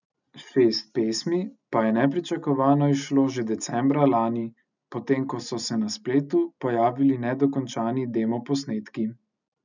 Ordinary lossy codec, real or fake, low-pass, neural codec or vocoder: none; real; 7.2 kHz; none